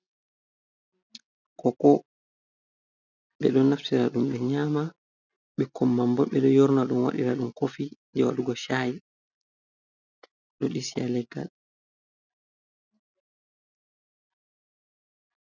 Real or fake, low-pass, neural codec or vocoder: real; 7.2 kHz; none